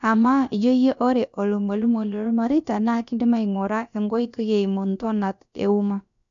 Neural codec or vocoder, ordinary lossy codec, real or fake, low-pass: codec, 16 kHz, about 1 kbps, DyCAST, with the encoder's durations; none; fake; 7.2 kHz